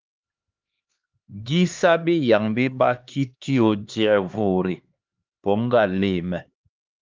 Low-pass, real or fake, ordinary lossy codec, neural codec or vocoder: 7.2 kHz; fake; Opus, 24 kbps; codec, 16 kHz, 2 kbps, X-Codec, HuBERT features, trained on LibriSpeech